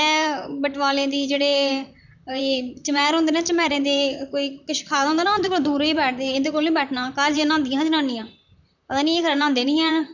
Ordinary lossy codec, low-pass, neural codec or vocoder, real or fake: none; 7.2 kHz; vocoder, 44.1 kHz, 128 mel bands, Pupu-Vocoder; fake